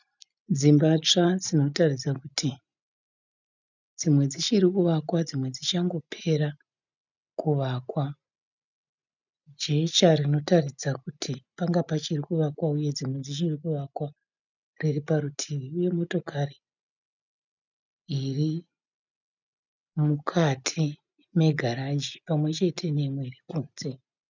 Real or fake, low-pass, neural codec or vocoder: real; 7.2 kHz; none